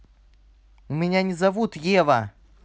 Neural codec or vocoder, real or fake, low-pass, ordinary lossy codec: none; real; none; none